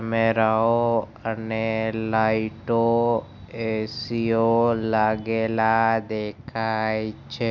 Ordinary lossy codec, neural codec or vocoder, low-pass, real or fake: none; none; 7.2 kHz; real